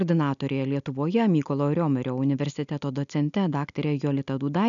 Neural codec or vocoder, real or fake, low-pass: none; real; 7.2 kHz